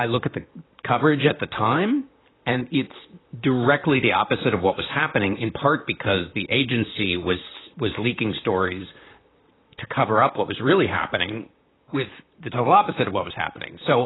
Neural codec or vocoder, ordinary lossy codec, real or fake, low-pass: vocoder, 44.1 kHz, 128 mel bands, Pupu-Vocoder; AAC, 16 kbps; fake; 7.2 kHz